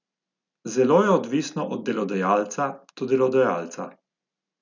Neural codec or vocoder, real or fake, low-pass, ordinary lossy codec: none; real; 7.2 kHz; none